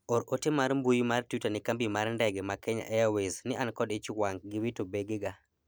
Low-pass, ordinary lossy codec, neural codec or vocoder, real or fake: none; none; none; real